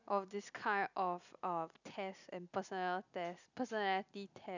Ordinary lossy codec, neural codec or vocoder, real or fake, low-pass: none; none; real; 7.2 kHz